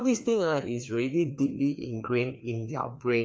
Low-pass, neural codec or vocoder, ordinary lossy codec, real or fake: none; codec, 16 kHz, 2 kbps, FreqCodec, larger model; none; fake